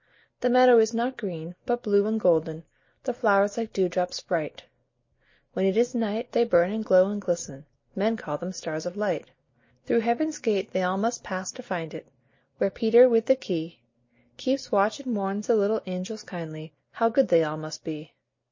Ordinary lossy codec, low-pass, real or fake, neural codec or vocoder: MP3, 32 kbps; 7.2 kHz; real; none